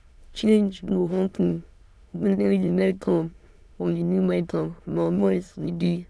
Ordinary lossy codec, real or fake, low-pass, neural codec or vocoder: none; fake; none; autoencoder, 22.05 kHz, a latent of 192 numbers a frame, VITS, trained on many speakers